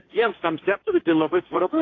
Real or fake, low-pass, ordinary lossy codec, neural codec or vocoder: fake; 7.2 kHz; AAC, 32 kbps; codec, 16 kHz, 1.1 kbps, Voila-Tokenizer